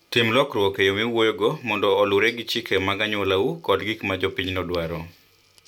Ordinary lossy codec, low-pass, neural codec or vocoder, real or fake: none; 19.8 kHz; none; real